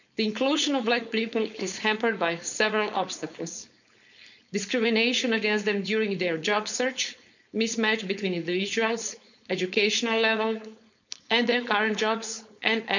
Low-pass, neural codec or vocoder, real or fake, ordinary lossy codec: 7.2 kHz; codec, 16 kHz, 4.8 kbps, FACodec; fake; none